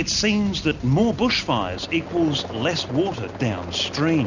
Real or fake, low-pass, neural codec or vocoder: real; 7.2 kHz; none